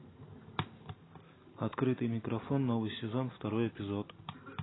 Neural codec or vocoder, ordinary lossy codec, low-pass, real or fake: none; AAC, 16 kbps; 7.2 kHz; real